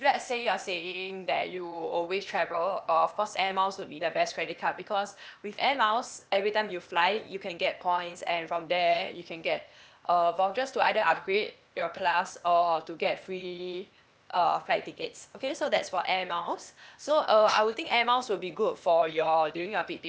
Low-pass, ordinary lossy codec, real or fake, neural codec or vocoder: none; none; fake; codec, 16 kHz, 0.8 kbps, ZipCodec